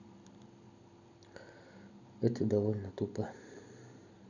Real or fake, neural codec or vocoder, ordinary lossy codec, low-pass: real; none; none; 7.2 kHz